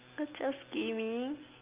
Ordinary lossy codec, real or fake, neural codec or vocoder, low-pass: Opus, 32 kbps; real; none; 3.6 kHz